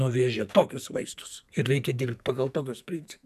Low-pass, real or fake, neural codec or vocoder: 14.4 kHz; fake; codec, 44.1 kHz, 2.6 kbps, SNAC